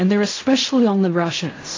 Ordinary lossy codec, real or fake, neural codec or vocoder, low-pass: AAC, 32 kbps; fake; codec, 16 kHz in and 24 kHz out, 0.4 kbps, LongCat-Audio-Codec, fine tuned four codebook decoder; 7.2 kHz